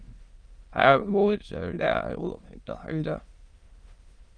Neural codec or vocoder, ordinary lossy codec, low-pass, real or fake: autoencoder, 22.05 kHz, a latent of 192 numbers a frame, VITS, trained on many speakers; Opus, 24 kbps; 9.9 kHz; fake